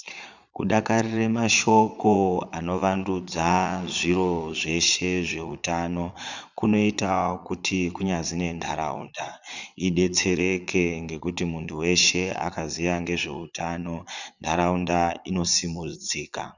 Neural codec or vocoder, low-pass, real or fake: vocoder, 22.05 kHz, 80 mel bands, Vocos; 7.2 kHz; fake